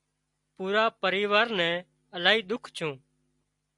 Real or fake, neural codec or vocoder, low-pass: real; none; 10.8 kHz